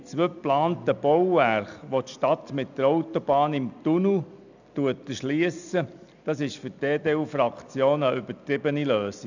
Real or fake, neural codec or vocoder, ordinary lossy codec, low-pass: real; none; none; 7.2 kHz